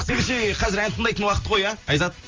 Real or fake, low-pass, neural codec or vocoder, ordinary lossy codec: real; 7.2 kHz; none; Opus, 32 kbps